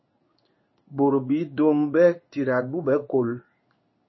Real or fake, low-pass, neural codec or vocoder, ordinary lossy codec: fake; 7.2 kHz; codec, 16 kHz in and 24 kHz out, 1 kbps, XY-Tokenizer; MP3, 24 kbps